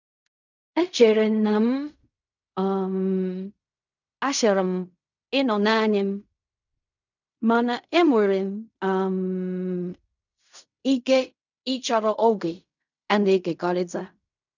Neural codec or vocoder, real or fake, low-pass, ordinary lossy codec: codec, 16 kHz in and 24 kHz out, 0.4 kbps, LongCat-Audio-Codec, fine tuned four codebook decoder; fake; 7.2 kHz; none